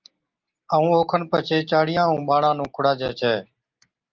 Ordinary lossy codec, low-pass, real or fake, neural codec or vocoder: Opus, 24 kbps; 7.2 kHz; real; none